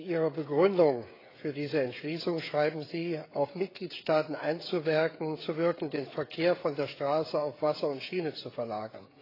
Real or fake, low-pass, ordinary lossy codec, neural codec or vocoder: fake; 5.4 kHz; AAC, 24 kbps; codec, 16 kHz, 16 kbps, FreqCodec, smaller model